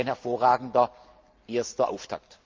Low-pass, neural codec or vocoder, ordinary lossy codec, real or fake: 7.2 kHz; none; Opus, 24 kbps; real